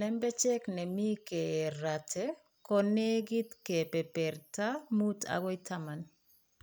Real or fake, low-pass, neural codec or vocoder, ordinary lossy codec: real; none; none; none